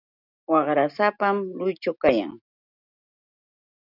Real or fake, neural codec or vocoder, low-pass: real; none; 5.4 kHz